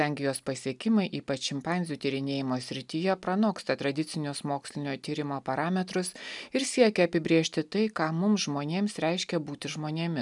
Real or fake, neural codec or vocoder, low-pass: real; none; 10.8 kHz